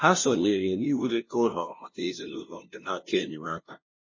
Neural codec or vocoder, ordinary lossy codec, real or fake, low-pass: codec, 16 kHz, 0.5 kbps, FunCodec, trained on LibriTTS, 25 frames a second; MP3, 32 kbps; fake; 7.2 kHz